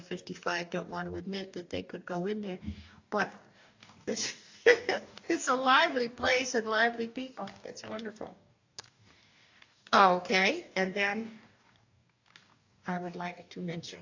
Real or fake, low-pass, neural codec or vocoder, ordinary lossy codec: fake; 7.2 kHz; codec, 44.1 kHz, 2.6 kbps, DAC; AAC, 48 kbps